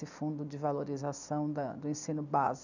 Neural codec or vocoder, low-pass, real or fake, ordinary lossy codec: none; 7.2 kHz; real; none